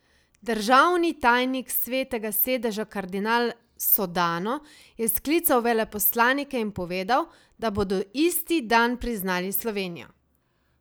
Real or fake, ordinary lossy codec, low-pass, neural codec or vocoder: real; none; none; none